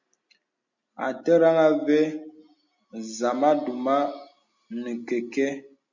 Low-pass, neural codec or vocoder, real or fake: 7.2 kHz; none; real